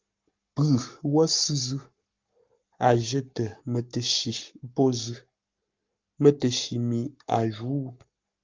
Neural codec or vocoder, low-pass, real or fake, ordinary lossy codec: codec, 44.1 kHz, 7.8 kbps, Pupu-Codec; 7.2 kHz; fake; Opus, 24 kbps